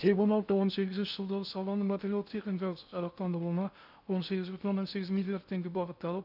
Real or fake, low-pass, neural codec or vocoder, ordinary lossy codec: fake; 5.4 kHz; codec, 16 kHz in and 24 kHz out, 0.6 kbps, FocalCodec, streaming, 2048 codes; none